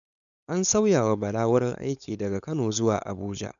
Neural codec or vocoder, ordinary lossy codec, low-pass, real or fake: codec, 16 kHz, 4.8 kbps, FACodec; none; 7.2 kHz; fake